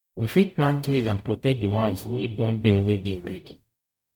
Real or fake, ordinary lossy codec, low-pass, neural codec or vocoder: fake; none; 19.8 kHz; codec, 44.1 kHz, 0.9 kbps, DAC